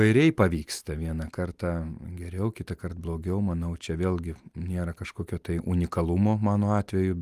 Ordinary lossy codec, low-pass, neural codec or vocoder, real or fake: Opus, 32 kbps; 14.4 kHz; none; real